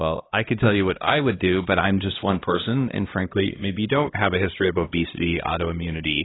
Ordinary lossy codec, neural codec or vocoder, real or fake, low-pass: AAC, 16 kbps; codec, 16 kHz, 1 kbps, X-Codec, HuBERT features, trained on LibriSpeech; fake; 7.2 kHz